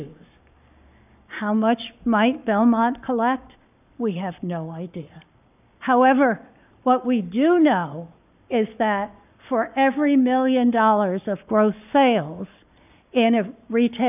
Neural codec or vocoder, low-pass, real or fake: codec, 44.1 kHz, 7.8 kbps, Pupu-Codec; 3.6 kHz; fake